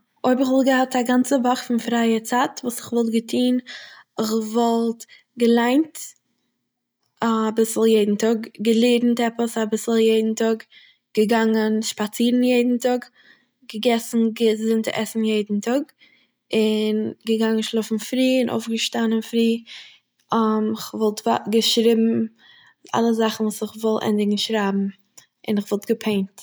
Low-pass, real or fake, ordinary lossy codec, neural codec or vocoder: none; real; none; none